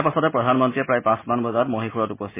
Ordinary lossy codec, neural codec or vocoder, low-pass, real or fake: MP3, 16 kbps; none; 3.6 kHz; real